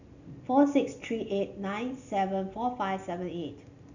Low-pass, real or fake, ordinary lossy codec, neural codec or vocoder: 7.2 kHz; real; none; none